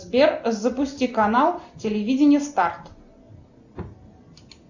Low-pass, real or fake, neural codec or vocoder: 7.2 kHz; real; none